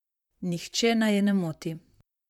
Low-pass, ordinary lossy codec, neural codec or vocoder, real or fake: 19.8 kHz; MP3, 96 kbps; vocoder, 44.1 kHz, 128 mel bands, Pupu-Vocoder; fake